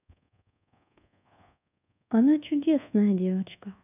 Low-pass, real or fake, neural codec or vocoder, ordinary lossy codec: 3.6 kHz; fake; codec, 24 kHz, 1.2 kbps, DualCodec; none